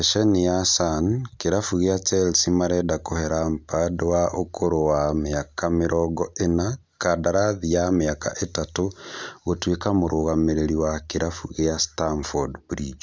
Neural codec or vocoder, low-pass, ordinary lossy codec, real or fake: none; none; none; real